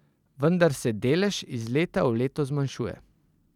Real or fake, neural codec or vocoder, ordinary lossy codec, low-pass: fake; vocoder, 44.1 kHz, 128 mel bands every 512 samples, BigVGAN v2; none; 19.8 kHz